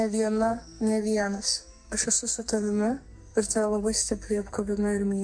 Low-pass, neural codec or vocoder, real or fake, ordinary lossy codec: 9.9 kHz; codec, 44.1 kHz, 2.6 kbps, SNAC; fake; MP3, 64 kbps